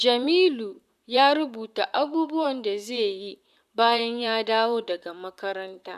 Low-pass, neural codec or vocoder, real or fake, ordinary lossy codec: 14.4 kHz; vocoder, 44.1 kHz, 128 mel bands, Pupu-Vocoder; fake; none